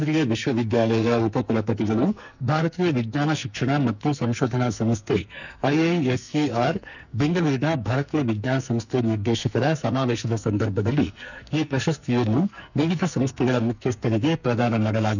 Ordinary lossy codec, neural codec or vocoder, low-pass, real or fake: none; codec, 44.1 kHz, 2.6 kbps, SNAC; 7.2 kHz; fake